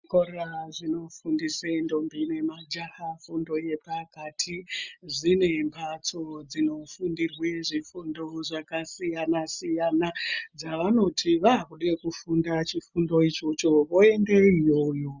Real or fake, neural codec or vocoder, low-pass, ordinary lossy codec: real; none; 7.2 kHz; Opus, 64 kbps